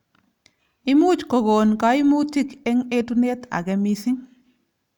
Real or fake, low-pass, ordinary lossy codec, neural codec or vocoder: real; 19.8 kHz; none; none